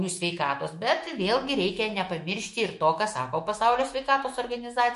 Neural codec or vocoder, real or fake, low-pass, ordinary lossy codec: autoencoder, 48 kHz, 128 numbers a frame, DAC-VAE, trained on Japanese speech; fake; 14.4 kHz; MP3, 48 kbps